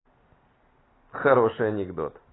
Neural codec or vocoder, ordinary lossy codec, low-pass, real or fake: none; AAC, 16 kbps; 7.2 kHz; real